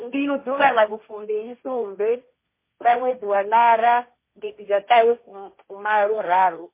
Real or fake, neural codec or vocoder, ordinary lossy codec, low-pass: fake; codec, 16 kHz, 1.1 kbps, Voila-Tokenizer; MP3, 24 kbps; 3.6 kHz